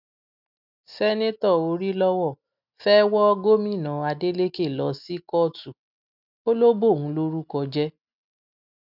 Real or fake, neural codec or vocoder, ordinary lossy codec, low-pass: real; none; none; 5.4 kHz